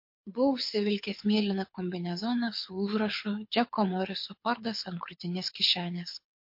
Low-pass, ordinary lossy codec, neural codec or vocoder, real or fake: 5.4 kHz; MP3, 32 kbps; codec, 24 kHz, 6 kbps, HILCodec; fake